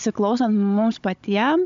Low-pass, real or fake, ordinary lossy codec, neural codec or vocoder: 7.2 kHz; fake; MP3, 64 kbps; codec, 16 kHz, 16 kbps, FunCodec, trained on Chinese and English, 50 frames a second